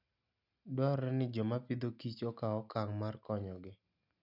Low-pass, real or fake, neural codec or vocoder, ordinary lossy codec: 5.4 kHz; real; none; none